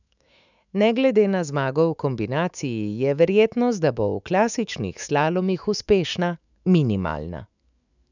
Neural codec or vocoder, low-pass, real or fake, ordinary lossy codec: autoencoder, 48 kHz, 128 numbers a frame, DAC-VAE, trained on Japanese speech; 7.2 kHz; fake; none